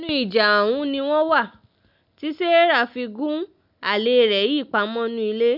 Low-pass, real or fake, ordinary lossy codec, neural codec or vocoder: 5.4 kHz; real; none; none